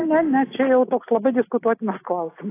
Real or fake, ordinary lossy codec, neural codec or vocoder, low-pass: real; AAC, 24 kbps; none; 3.6 kHz